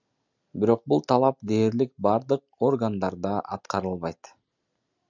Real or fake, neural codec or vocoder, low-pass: real; none; 7.2 kHz